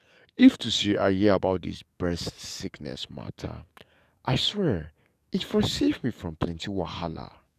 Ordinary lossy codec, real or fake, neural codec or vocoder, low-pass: none; fake; codec, 44.1 kHz, 7.8 kbps, DAC; 14.4 kHz